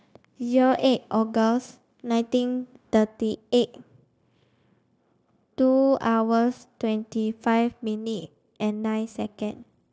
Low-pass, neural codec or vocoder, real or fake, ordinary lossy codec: none; codec, 16 kHz, 0.9 kbps, LongCat-Audio-Codec; fake; none